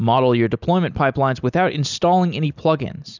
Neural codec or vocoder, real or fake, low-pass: none; real; 7.2 kHz